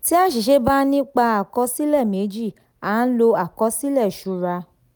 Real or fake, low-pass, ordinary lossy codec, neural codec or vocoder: real; none; none; none